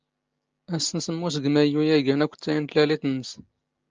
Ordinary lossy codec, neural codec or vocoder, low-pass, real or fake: Opus, 24 kbps; none; 7.2 kHz; real